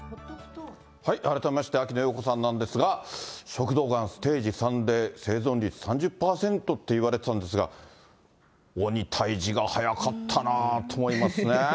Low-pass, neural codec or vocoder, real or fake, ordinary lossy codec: none; none; real; none